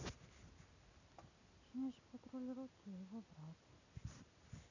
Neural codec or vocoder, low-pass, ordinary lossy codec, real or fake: none; 7.2 kHz; none; real